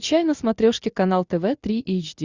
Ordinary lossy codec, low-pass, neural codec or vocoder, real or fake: Opus, 64 kbps; 7.2 kHz; none; real